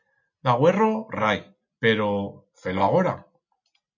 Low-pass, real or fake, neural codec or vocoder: 7.2 kHz; real; none